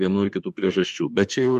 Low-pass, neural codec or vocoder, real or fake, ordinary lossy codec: 14.4 kHz; autoencoder, 48 kHz, 32 numbers a frame, DAC-VAE, trained on Japanese speech; fake; MP3, 64 kbps